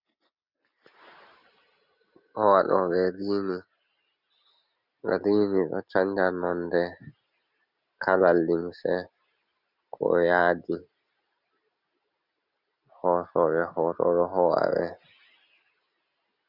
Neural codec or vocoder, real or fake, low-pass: none; real; 5.4 kHz